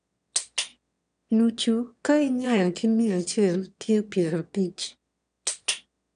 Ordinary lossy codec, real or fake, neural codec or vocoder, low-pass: none; fake; autoencoder, 22.05 kHz, a latent of 192 numbers a frame, VITS, trained on one speaker; 9.9 kHz